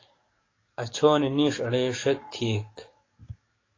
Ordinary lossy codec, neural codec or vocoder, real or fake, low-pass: AAC, 32 kbps; codec, 44.1 kHz, 7.8 kbps, DAC; fake; 7.2 kHz